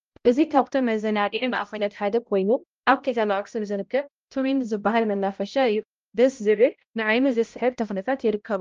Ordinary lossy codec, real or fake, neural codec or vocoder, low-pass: Opus, 24 kbps; fake; codec, 16 kHz, 0.5 kbps, X-Codec, HuBERT features, trained on balanced general audio; 7.2 kHz